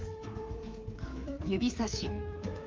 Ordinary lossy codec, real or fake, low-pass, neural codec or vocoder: Opus, 24 kbps; fake; 7.2 kHz; codec, 24 kHz, 3.1 kbps, DualCodec